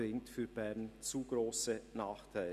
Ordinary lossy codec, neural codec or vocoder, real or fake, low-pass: none; none; real; 14.4 kHz